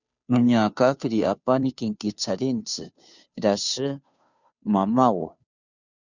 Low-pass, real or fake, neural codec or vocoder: 7.2 kHz; fake; codec, 16 kHz, 2 kbps, FunCodec, trained on Chinese and English, 25 frames a second